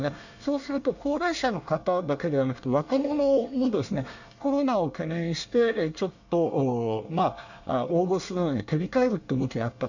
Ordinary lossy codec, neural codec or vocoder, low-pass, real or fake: none; codec, 24 kHz, 1 kbps, SNAC; 7.2 kHz; fake